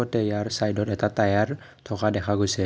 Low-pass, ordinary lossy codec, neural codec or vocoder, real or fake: none; none; none; real